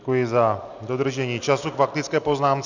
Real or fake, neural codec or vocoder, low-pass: real; none; 7.2 kHz